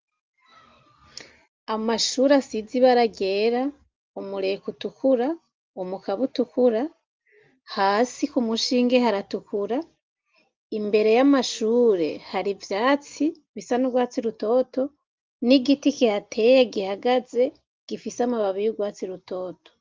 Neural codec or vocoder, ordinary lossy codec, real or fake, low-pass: none; Opus, 32 kbps; real; 7.2 kHz